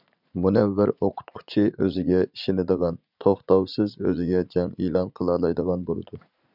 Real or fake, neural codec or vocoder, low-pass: fake; vocoder, 44.1 kHz, 80 mel bands, Vocos; 5.4 kHz